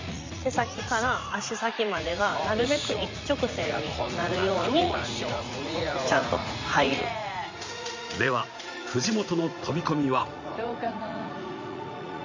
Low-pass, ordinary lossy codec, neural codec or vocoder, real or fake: 7.2 kHz; MP3, 48 kbps; vocoder, 44.1 kHz, 80 mel bands, Vocos; fake